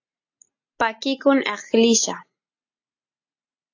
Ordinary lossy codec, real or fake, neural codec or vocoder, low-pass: AAC, 48 kbps; real; none; 7.2 kHz